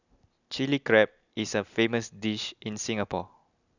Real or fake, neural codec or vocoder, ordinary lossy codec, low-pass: real; none; none; 7.2 kHz